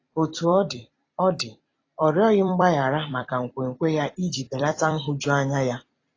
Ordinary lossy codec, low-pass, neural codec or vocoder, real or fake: AAC, 32 kbps; 7.2 kHz; vocoder, 44.1 kHz, 128 mel bands every 256 samples, BigVGAN v2; fake